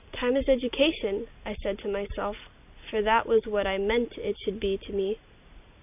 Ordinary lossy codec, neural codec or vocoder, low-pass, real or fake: AAC, 32 kbps; none; 3.6 kHz; real